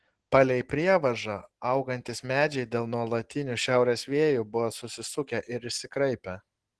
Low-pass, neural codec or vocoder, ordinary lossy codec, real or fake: 10.8 kHz; none; Opus, 16 kbps; real